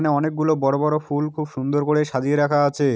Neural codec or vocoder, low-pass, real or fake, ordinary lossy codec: none; none; real; none